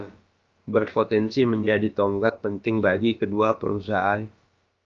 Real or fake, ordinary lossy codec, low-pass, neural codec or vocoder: fake; Opus, 24 kbps; 7.2 kHz; codec, 16 kHz, about 1 kbps, DyCAST, with the encoder's durations